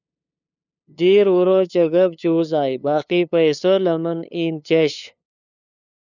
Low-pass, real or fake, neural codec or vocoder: 7.2 kHz; fake; codec, 16 kHz, 2 kbps, FunCodec, trained on LibriTTS, 25 frames a second